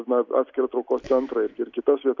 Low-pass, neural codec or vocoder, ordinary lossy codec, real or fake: 7.2 kHz; none; MP3, 64 kbps; real